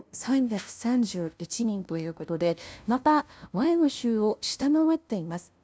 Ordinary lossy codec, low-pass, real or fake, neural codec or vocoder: none; none; fake; codec, 16 kHz, 0.5 kbps, FunCodec, trained on LibriTTS, 25 frames a second